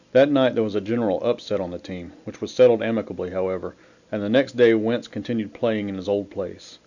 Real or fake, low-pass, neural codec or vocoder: real; 7.2 kHz; none